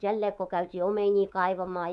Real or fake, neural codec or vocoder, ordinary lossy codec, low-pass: fake; codec, 24 kHz, 3.1 kbps, DualCodec; none; none